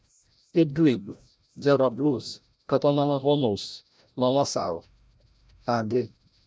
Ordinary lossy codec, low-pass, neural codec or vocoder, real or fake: none; none; codec, 16 kHz, 0.5 kbps, FreqCodec, larger model; fake